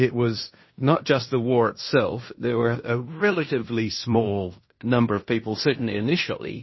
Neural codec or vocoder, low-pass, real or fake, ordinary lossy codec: codec, 16 kHz in and 24 kHz out, 0.9 kbps, LongCat-Audio-Codec, fine tuned four codebook decoder; 7.2 kHz; fake; MP3, 24 kbps